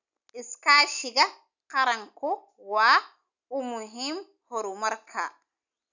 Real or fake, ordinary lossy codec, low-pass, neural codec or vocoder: real; none; 7.2 kHz; none